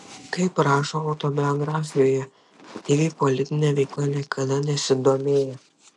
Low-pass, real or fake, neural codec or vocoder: 10.8 kHz; real; none